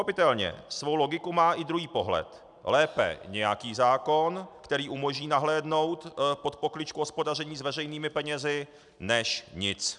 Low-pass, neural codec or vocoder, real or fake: 10.8 kHz; none; real